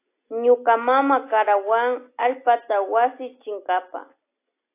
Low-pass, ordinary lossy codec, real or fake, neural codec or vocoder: 3.6 kHz; AAC, 24 kbps; real; none